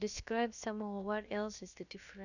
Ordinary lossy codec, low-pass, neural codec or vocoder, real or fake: none; 7.2 kHz; codec, 16 kHz, about 1 kbps, DyCAST, with the encoder's durations; fake